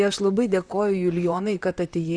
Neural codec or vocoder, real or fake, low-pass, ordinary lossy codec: vocoder, 44.1 kHz, 128 mel bands, Pupu-Vocoder; fake; 9.9 kHz; Opus, 64 kbps